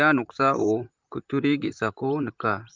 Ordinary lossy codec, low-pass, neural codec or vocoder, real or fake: Opus, 24 kbps; 7.2 kHz; vocoder, 44.1 kHz, 128 mel bands, Pupu-Vocoder; fake